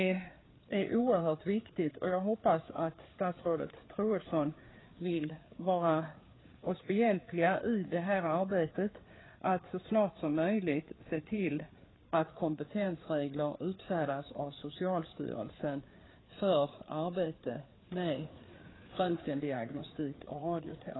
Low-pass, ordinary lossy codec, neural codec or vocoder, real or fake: 7.2 kHz; AAC, 16 kbps; codec, 16 kHz, 4 kbps, FreqCodec, larger model; fake